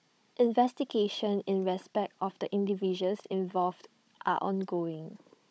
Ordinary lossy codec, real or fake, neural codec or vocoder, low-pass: none; fake; codec, 16 kHz, 16 kbps, FunCodec, trained on Chinese and English, 50 frames a second; none